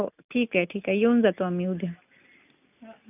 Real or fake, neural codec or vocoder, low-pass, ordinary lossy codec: real; none; 3.6 kHz; none